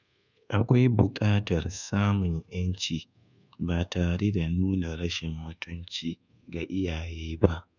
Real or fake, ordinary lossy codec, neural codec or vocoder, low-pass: fake; none; codec, 24 kHz, 1.2 kbps, DualCodec; 7.2 kHz